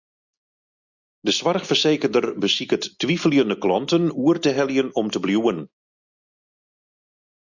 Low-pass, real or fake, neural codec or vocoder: 7.2 kHz; real; none